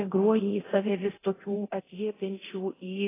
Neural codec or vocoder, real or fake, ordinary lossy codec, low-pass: codec, 24 kHz, 0.9 kbps, DualCodec; fake; AAC, 16 kbps; 3.6 kHz